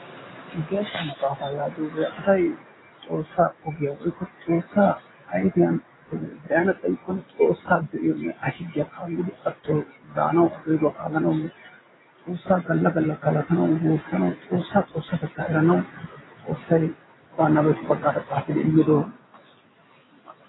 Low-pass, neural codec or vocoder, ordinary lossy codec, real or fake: 7.2 kHz; none; AAC, 16 kbps; real